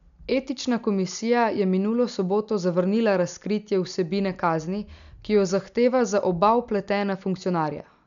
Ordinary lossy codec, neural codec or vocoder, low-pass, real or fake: MP3, 96 kbps; none; 7.2 kHz; real